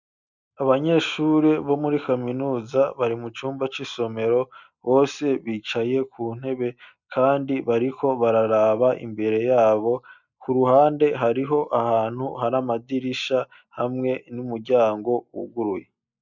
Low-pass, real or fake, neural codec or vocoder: 7.2 kHz; real; none